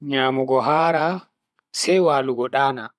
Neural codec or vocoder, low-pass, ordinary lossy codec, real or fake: vocoder, 24 kHz, 100 mel bands, Vocos; none; none; fake